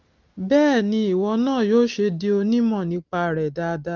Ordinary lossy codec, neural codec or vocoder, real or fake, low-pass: Opus, 24 kbps; none; real; 7.2 kHz